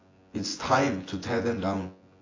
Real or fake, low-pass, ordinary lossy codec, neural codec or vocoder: fake; 7.2 kHz; AAC, 32 kbps; vocoder, 24 kHz, 100 mel bands, Vocos